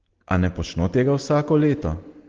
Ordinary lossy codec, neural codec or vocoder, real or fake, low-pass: Opus, 16 kbps; none; real; 7.2 kHz